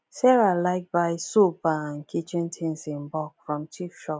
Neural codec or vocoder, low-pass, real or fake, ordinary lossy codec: none; none; real; none